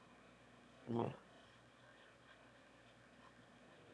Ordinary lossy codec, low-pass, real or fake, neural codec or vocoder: none; none; fake; autoencoder, 22.05 kHz, a latent of 192 numbers a frame, VITS, trained on one speaker